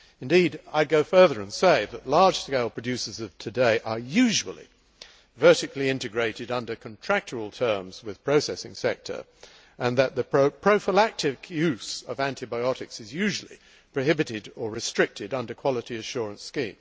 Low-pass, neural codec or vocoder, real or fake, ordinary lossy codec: none; none; real; none